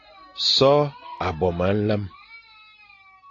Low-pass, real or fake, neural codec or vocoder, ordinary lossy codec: 7.2 kHz; real; none; AAC, 32 kbps